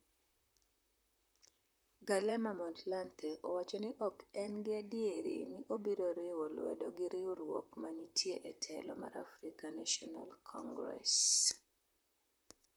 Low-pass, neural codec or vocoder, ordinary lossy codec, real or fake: none; vocoder, 44.1 kHz, 128 mel bands, Pupu-Vocoder; none; fake